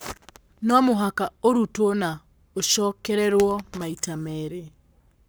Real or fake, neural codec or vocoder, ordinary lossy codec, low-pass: fake; codec, 44.1 kHz, 7.8 kbps, Pupu-Codec; none; none